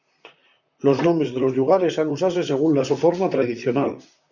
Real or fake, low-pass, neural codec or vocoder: fake; 7.2 kHz; vocoder, 44.1 kHz, 128 mel bands, Pupu-Vocoder